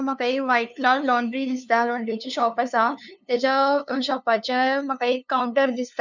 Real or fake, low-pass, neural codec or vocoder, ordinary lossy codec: fake; 7.2 kHz; codec, 16 kHz, 2 kbps, FunCodec, trained on LibriTTS, 25 frames a second; none